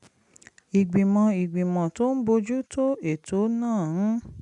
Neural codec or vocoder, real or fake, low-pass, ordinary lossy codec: none; real; 10.8 kHz; none